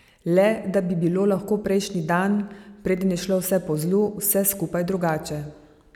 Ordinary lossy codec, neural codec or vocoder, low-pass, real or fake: none; none; 19.8 kHz; real